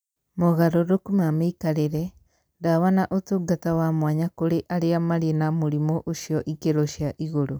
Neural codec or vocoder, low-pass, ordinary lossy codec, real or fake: none; none; none; real